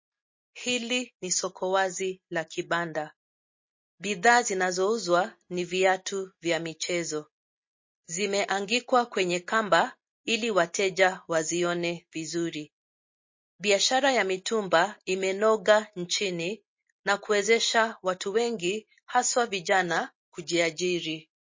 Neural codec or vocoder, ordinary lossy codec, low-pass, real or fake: none; MP3, 32 kbps; 7.2 kHz; real